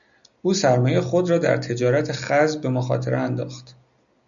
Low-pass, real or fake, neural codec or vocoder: 7.2 kHz; real; none